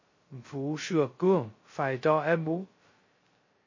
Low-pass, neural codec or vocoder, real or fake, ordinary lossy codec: 7.2 kHz; codec, 16 kHz, 0.2 kbps, FocalCodec; fake; MP3, 32 kbps